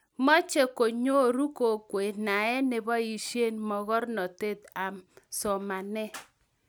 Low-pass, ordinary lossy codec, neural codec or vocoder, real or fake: none; none; none; real